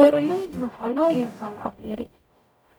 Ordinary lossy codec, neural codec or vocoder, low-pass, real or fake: none; codec, 44.1 kHz, 0.9 kbps, DAC; none; fake